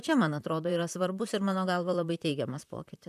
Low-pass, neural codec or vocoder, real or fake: 14.4 kHz; vocoder, 44.1 kHz, 128 mel bands, Pupu-Vocoder; fake